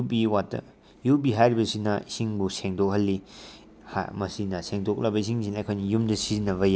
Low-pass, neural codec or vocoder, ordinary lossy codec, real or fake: none; none; none; real